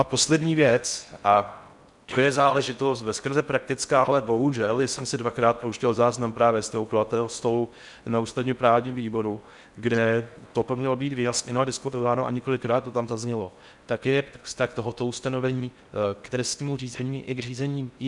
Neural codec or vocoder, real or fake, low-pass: codec, 16 kHz in and 24 kHz out, 0.6 kbps, FocalCodec, streaming, 4096 codes; fake; 10.8 kHz